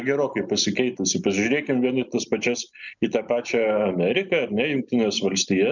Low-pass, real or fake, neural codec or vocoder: 7.2 kHz; real; none